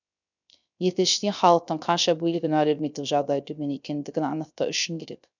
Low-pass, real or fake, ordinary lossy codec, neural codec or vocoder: 7.2 kHz; fake; none; codec, 16 kHz, 0.3 kbps, FocalCodec